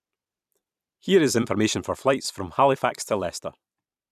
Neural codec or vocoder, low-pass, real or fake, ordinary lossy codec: vocoder, 44.1 kHz, 128 mel bands every 256 samples, BigVGAN v2; 14.4 kHz; fake; none